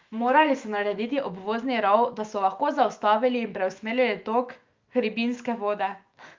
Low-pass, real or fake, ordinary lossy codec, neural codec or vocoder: 7.2 kHz; fake; Opus, 32 kbps; autoencoder, 48 kHz, 128 numbers a frame, DAC-VAE, trained on Japanese speech